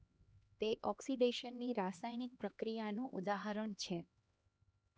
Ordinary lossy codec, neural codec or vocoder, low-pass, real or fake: none; codec, 16 kHz, 2 kbps, X-Codec, HuBERT features, trained on LibriSpeech; none; fake